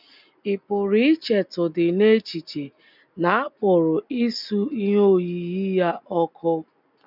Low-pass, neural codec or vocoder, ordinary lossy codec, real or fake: 5.4 kHz; none; none; real